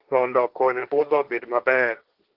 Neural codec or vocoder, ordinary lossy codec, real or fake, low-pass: codec, 16 kHz, 1.1 kbps, Voila-Tokenizer; Opus, 16 kbps; fake; 5.4 kHz